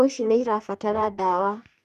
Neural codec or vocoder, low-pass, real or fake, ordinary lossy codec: codec, 44.1 kHz, 2.6 kbps, DAC; 14.4 kHz; fake; none